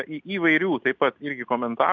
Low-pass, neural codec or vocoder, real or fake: 7.2 kHz; none; real